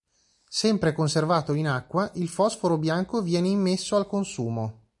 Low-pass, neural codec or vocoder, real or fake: 10.8 kHz; none; real